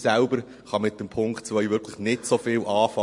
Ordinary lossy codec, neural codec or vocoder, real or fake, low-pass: MP3, 48 kbps; none; real; 10.8 kHz